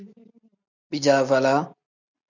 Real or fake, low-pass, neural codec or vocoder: real; 7.2 kHz; none